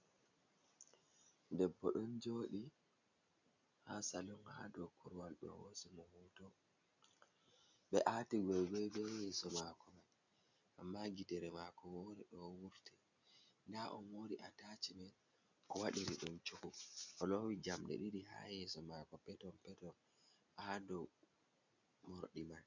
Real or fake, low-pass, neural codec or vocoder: real; 7.2 kHz; none